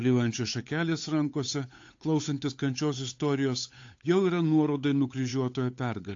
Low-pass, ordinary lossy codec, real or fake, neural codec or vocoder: 7.2 kHz; AAC, 48 kbps; fake; codec, 16 kHz, 8 kbps, FunCodec, trained on Chinese and English, 25 frames a second